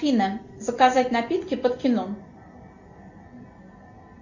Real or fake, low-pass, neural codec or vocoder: real; 7.2 kHz; none